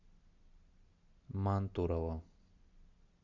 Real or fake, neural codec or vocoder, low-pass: real; none; 7.2 kHz